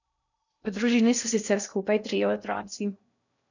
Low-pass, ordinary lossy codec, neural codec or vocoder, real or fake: 7.2 kHz; none; codec, 16 kHz in and 24 kHz out, 0.6 kbps, FocalCodec, streaming, 2048 codes; fake